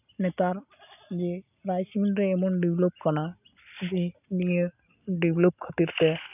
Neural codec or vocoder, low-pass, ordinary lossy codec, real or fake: none; 3.6 kHz; none; real